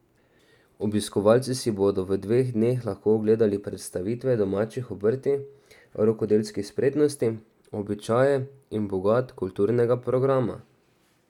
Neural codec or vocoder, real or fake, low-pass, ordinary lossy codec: none; real; 19.8 kHz; none